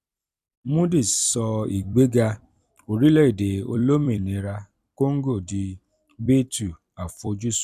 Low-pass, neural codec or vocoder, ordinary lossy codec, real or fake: 14.4 kHz; vocoder, 44.1 kHz, 128 mel bands every 256 samples, BigVGAN v2; none; fake